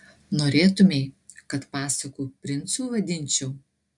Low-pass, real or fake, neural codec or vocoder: 10.8 kHz; real; none